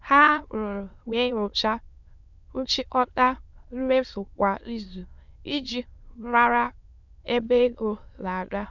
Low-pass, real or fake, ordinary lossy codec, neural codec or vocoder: 7.2 kHz; fake; none; autoencoder, 22.05 kHz, a latent of 192 numbers a frame, VITS, trained on many speakers